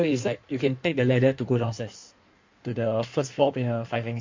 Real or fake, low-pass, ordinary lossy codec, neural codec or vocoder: fake; 7.2 kHz; MP3, 48 kbps; codec, 16 kHz in and 24 kHz out, 1.1 kbps, FireRedTTS-2 codec